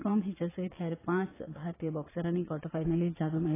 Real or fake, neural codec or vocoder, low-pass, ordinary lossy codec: fake; vocoder, 22.05 kHz, 80 mel bands, Vocos; 3.6 kHz; AAC, 16 kbps